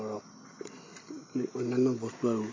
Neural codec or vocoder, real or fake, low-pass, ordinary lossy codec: none; real; 7.2 kHz; MP3, 32 kbps